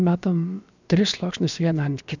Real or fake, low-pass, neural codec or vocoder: fake; 7.2 kHz; codec, 16 kHz, about 1 kbps, DyCAST, with the encoder's durations